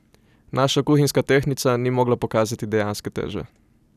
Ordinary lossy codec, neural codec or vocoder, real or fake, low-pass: none; none; real; 14.4 kHz